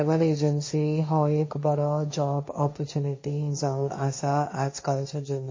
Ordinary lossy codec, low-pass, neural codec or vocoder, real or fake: MP3, 32 kbps; 7.2 kHz; codec, 16 kHz, 1.1 kbps, Voila-Tokenizer; fake